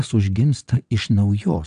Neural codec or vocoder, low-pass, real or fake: vocoder, 22.05 kHz, 80 mel bands, WaveNeXt; 9.9 kHz; fake